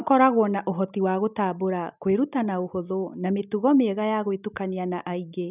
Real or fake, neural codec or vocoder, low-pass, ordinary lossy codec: real; none; 3.6 kHz; none